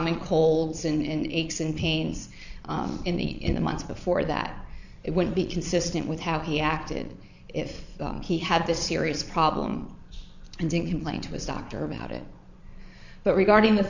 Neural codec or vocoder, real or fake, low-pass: vocoder, 22.05 kHz, 80 mel bands, Vocos; fake; 7.2 kHz